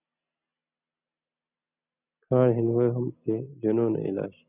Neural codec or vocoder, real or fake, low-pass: none; real; 3.6 kHz